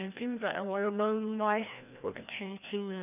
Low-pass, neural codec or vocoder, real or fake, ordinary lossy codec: 3.6 kHz; codec, 16 kHz, 1 kbps, FreqCodec, larger model; fake; none